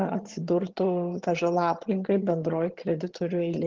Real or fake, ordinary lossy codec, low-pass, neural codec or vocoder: fake; Opus, 16 kbps; 7.2 kHz; vocoder, 22.05 kHz, 80 mel bands, HiFi-GAN